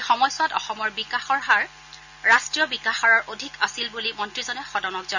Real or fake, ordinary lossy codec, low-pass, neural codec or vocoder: real; none; 7.2 kHz; none